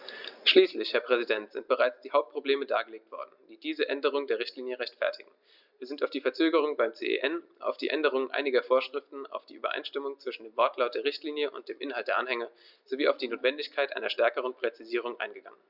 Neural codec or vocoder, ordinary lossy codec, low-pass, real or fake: none; Opus, 64 kbps; 5.4 kHz; real